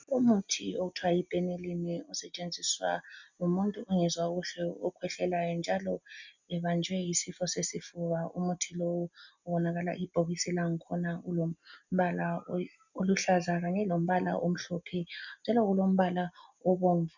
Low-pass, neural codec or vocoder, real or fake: 7.2 kHz; none; real